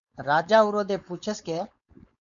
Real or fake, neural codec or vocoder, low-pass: fake; codec, 16 kHz, 4.8 kbps, FACodec; 7.2 kHz